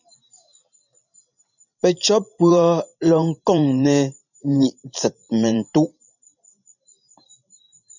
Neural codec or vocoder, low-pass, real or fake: vocoder, 44.1 kHz, 128 mel bands every 512 samples, BigVGAN v2; 7.2 kHz; fake